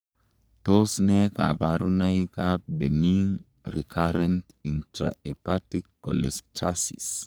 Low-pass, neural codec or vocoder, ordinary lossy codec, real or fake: none; codec, 44.1 kHz, 3.4 kbps, Pupu-Codec; none; fake